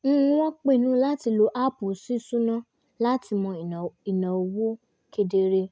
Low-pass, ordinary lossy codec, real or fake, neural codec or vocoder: none; none; real; none